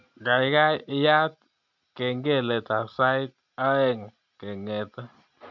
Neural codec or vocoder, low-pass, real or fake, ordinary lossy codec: none; 7.2 kHz; real; none